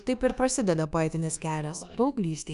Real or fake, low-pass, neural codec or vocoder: fake; 10.8 kHz; codec, 24 kHz, 1.2 kbps, DualCodec